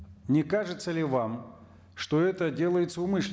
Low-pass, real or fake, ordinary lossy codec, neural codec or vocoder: none; real; none; none